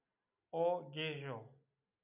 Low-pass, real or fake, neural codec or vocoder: 3.6 kHz; real; none